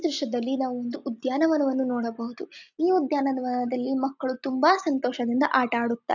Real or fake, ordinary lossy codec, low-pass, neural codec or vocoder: real; none; 7.2 kHz; none